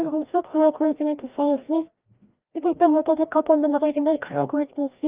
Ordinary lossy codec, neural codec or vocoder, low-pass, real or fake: Opus, 24 kbps; codec, 16 kHz, 1 kbps, FreqCodec, larger model; 3.6 kHz; fake